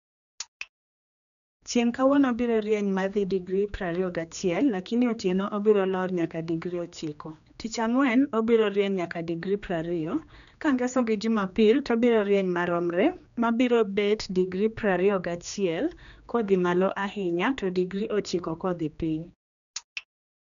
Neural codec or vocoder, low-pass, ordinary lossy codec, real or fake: codec, 16 kHz, 2 kbps, X-Codec, HuBERT features, trained on general audio; 7.2 kHz; none; fake